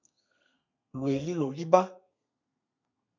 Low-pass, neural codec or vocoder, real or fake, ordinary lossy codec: 7.2 kHz; codec, 32 kHz, 1.9 kbps, SNAC; fake; MP3, 64 kbps